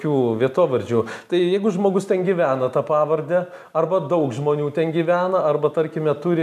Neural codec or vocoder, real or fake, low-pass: none; real; 14.4 kHz